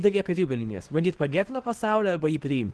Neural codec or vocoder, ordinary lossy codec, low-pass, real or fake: codec, 24 kHz, 0.9 kbps, WavTokenizer, small release; Opus, 16 kbps; 10.8 kHz; fake